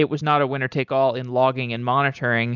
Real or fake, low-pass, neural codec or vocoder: real; 7.2 kHz; none